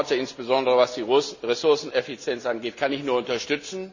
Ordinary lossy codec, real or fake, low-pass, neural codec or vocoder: none; real; 7.2 kHz; none